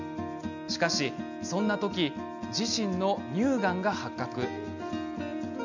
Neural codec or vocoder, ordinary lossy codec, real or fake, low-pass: none; none; real; 7.2 kHz